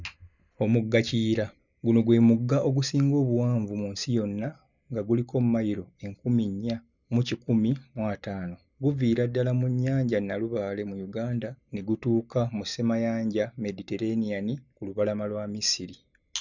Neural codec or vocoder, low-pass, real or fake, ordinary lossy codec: none; 7.2 kHz; real; none